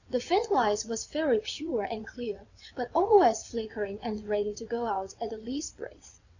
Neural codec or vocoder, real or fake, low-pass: vocoder, 22.05 kHz, 80 mel bands, WaveNeXt; fake; 7.2 kHz